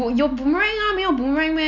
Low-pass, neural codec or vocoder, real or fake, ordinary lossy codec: 7.2 kHz; none; real; none